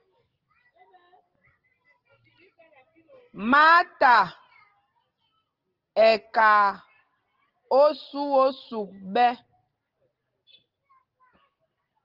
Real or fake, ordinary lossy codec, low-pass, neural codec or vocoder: real; Opus, 16 kbps; 5.4 kHz; none